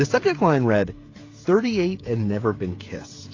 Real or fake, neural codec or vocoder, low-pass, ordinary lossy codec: fake; codec, 16 kHz, 16 kbps, FreqCodec, smaller model; 7.2 kHz; AAC, 32 kbps